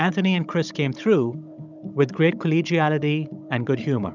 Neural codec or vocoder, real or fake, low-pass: codec, 16 kHz, 16 kbps, FunCodec, trained on Chinese and English, 50 frames a second; fake; 7.2 kHz